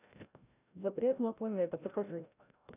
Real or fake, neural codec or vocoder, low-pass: fake; codec, 16 kHz, 0.5 kbps, FreqCodec, larger model; 3.6 kHz